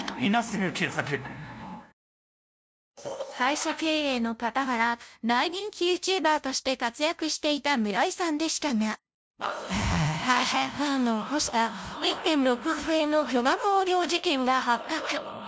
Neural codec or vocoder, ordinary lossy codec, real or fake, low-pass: codec, 16 kHz, 0.5 kbps, FunCodec, trained on LibriTTS, 25 frames a second; none; fake; none